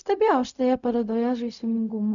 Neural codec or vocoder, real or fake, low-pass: codec, 16 kHz, 0.4 kbps, LongCat-Audio-Codec; fake; 7.2 kHz